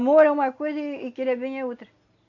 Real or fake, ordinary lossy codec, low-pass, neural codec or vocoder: real; none; 7.2 kHz; none